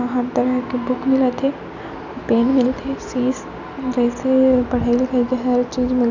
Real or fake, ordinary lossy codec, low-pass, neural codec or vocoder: real; none; 7.2 kHz; none